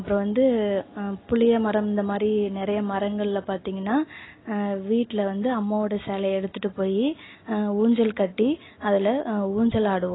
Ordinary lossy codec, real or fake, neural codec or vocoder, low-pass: AAC, 16 kbps; real; none; 7.2 kHz